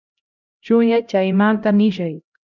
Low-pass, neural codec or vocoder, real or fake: 7.2 kHz; codec, 16 kHz, 0.5 kbps, X-Codec, HuBERT features, trained on LibriSpeech; fake